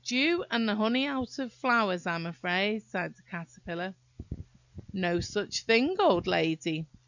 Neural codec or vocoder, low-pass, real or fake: none; 7.2 kHz; real